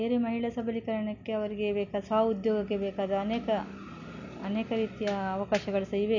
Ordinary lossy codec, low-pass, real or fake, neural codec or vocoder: none; 7.2 kHz; real; none